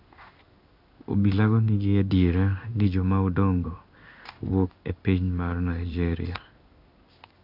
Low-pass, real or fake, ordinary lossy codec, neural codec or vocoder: 5.4 kHz; fake; none; codec, 16 kHz in and 24 kHz out, 1 kbps, XY-Tokenizer